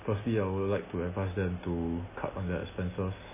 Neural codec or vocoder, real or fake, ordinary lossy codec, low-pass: none; real; MP3, 16 kbps; 3.6 kHz